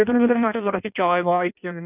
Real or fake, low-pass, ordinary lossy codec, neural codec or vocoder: fake; 3.6 kHz; none; codec, 16 kHz in and 24 kHz out, 0.6 kbps, FireRedTTS-2 codec